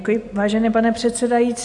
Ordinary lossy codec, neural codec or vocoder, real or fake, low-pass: AAC, 64 kbps; none; real; 10.8 kHz